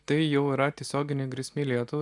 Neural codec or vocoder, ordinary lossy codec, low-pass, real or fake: none; MP3, 96 kbps; 10.8 kHz; real